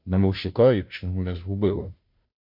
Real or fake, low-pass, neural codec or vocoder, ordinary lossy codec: fake; 5.4 kHz; codec, 16 kHz, 0.5 kbps, FunCodec, trained on Chinese and English, 25 frames a second; MP3, 48 kbps